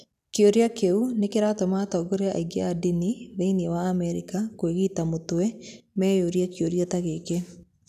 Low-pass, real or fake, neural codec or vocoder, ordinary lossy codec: 14.4 kHz; real; none; none